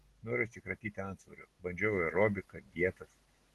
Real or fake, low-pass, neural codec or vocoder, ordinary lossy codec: real; 14.4 kHz; none; Opus, 16 kbps